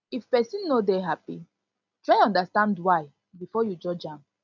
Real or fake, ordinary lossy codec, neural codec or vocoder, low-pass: real; none; none; 7.2 kHz